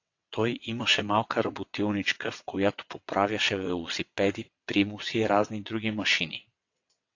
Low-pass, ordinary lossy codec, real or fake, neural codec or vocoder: 7.2 kHz; AAC, 48 kbps; fake; vocoder, 22.05 kHz, 80 mel bands, WaveNeXt